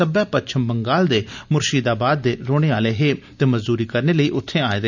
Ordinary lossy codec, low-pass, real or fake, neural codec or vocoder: none; 7.2 kHz; real; none